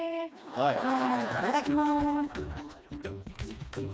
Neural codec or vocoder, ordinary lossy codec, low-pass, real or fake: codec, 16 kHz, 2 kbps, FreqCodec, smaller model; none; none; fake